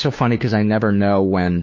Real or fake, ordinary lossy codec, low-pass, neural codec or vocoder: fake; MP3, 32 kbps; 7.2 kHz; codec, 16 kHz, 2 kbps, FunCodec, trained on Chinese and English, 25 frames a second